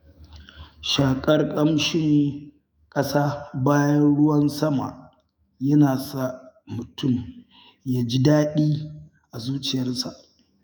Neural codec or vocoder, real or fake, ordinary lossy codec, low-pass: autoencoder, 48 kHz, 128 numbers a frame, DAC-VAE, trained on Japanese speech; fake; none; none